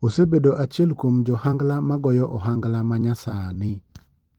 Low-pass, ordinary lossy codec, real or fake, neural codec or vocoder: 19.8 kHz; Opus, 24 kbps; fake; vocoder, 44.1 kHz, 128 mel bands every 256 samples, BigVGAN v2